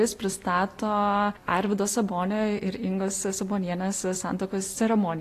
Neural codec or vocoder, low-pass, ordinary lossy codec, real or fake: none; 14.4 kHz; AAC, 48 kbps; real